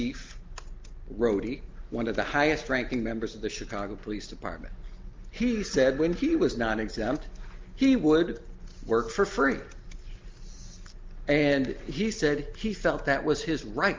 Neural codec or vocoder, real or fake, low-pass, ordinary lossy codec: none; real; 7.2 kHz; Opus, 32 kbps